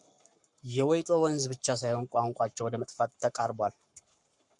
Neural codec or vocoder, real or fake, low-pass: codec, 44.1 kHz, 7.8 kbps, Pupu-Codec; fake; 10.8 kHz